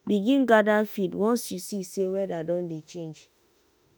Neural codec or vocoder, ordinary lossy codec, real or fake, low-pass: autoencoder, 48 kHz, 32 numbers a frame, DAC-VAE, trained on Japanese speech; none; fake; none